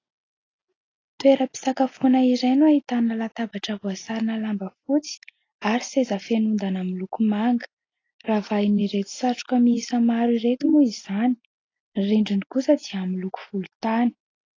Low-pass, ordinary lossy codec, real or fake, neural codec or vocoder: 7.2 kHz; AAC, 32 kbps; real; none